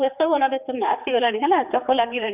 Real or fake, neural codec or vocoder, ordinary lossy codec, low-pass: fake; codec, 16 kHz, 4 kbps, X-Codec, HuBERT features, trained on general audio; none; 3.6 kHz